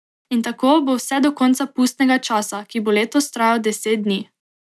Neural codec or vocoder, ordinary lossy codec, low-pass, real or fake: none; none; none; real